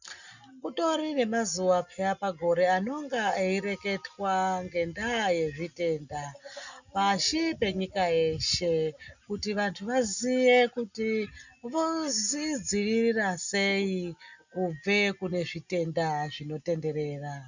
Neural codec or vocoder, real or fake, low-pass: none; real; 7.2 kHz